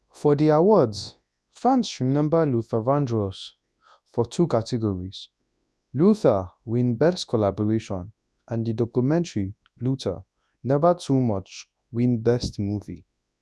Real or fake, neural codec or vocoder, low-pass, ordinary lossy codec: fake; codec, 24 kHz, 0.9 kbps, WavTokenizer, large speech release; none; none